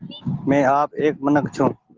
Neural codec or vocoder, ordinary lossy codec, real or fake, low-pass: none; Opus, 16 kbps; real; 7.2 kHz